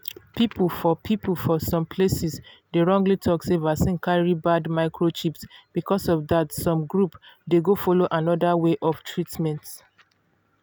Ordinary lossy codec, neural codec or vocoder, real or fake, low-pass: none; none; real; none